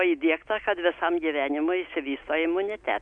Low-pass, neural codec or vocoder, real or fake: 9.9 kHz; none; real